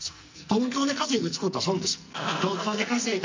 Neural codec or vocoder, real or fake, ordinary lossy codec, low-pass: codec, 44.1 kHz, 2.6 kbps, SNAC; fake; MP3, 64 kbps; 7.2 kHz